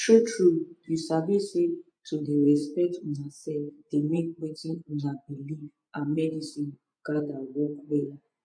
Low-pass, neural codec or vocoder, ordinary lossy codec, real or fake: 9.9 kHz; vocoder, 24 kHz, 100 mel bands, Vocos; MP3, 48 kbps; fake